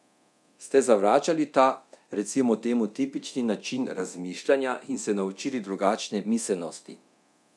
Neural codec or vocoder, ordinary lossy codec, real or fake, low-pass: codec, 24 kHz, 0.9 kbps, DualCodec; none; fake; none